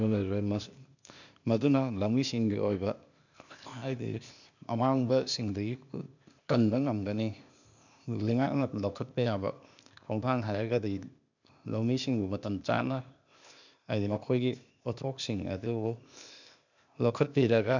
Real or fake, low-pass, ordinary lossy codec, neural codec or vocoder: fake; 7.2 kHz; none; codec, 16 kHz, 0.8 kbps, ZipCodec